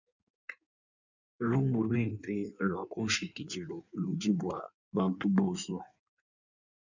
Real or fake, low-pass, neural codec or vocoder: fake; 7.2 kHz; codec, 16 kHz in and 24 kHz out, 1.1 kbps, FireRedTTS-2 codec